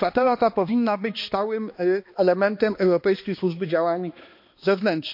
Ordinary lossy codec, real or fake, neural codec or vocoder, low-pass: MP3, 32 kbps; fake; codec, 16 kHz, 2 kbps, X-Codec, HuBERT features, trained on balanced general audio; 5.4 kHz